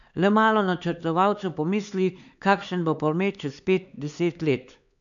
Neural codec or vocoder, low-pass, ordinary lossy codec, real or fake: codec, 16 kHz, 4 kbps, X-Codec, HuBERT features, trained on LibriSpeech; 7.2 kHz; none; fake